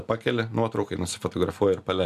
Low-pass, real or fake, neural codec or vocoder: 14.4 kHz; real; none